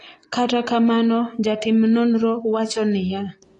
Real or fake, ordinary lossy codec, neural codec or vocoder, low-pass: real; AAC, 32 kbps; none; 10.8 kHz